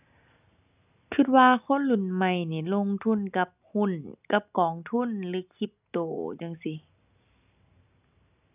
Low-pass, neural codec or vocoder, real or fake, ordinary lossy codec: 3.6 kHz; none; real; none